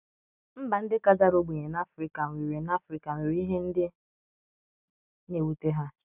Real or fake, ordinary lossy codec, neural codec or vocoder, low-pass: real; none; none; 3.6 kHz